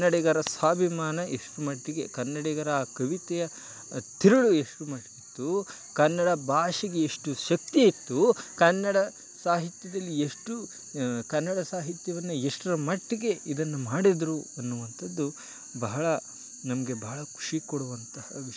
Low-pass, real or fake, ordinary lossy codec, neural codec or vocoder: none; real; none; none